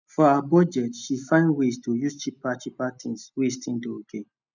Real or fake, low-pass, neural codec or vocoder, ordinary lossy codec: real; 7.2 kHz; none; none